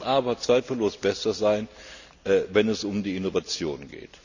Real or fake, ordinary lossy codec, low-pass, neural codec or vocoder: real; none; 7.2 kHz; none